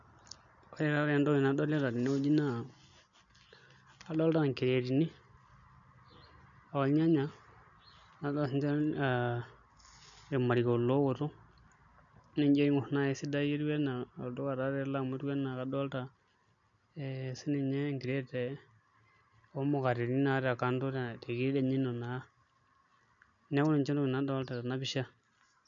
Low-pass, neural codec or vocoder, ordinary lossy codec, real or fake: 7.2 kHz; none; none; real